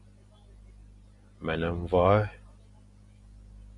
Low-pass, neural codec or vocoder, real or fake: 10.8 kHz; none; real